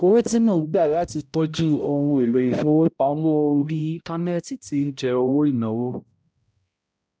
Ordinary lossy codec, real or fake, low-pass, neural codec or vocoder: none; fake; none; codec, 16 kHz, 0.5 kbps, X-Codec, HuBERT features, trained on balanced general audio